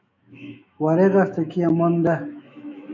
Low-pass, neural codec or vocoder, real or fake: 7.2 kHz; autoencoder, 48 kHz, 128 numbers a frame, DAC-VAE, trained on Japanese speech; fake